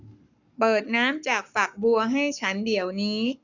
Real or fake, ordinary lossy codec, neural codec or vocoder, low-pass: fake; none; codec, 44.1 kHz, 7.8 kbps, Pupu-Codec; 7.2 kHz